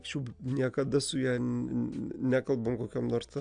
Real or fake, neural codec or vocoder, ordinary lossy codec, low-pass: real; none; AAC, 64 kbps; 9.9 kHz